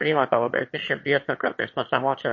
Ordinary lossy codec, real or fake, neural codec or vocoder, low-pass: MP3, 32 kbps; fake; autoencoder, 22.05 kHz, a latent of 192 numbers a frame, VITS, trained on one speaker; 7.2 kHz